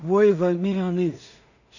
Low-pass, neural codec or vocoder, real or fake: 7.2 kHz; codec, 16 kHz in and 24 kHz out, 0.4 kbps, LongCat-Audio-Codec, two codebook decoder; fake